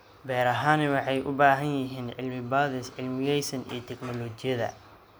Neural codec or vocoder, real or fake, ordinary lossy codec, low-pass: none; real; none; none